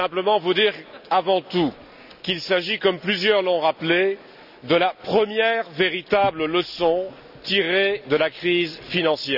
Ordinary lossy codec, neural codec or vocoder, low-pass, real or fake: none; none; 5.4 kHz; real